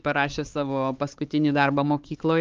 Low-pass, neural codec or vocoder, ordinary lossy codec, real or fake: 7.2 kHz; codec, 16 kHz, 4 kbps, X-Codec, HuBERT features, trained on LibriSpeech; Opus, 32 kbps; fake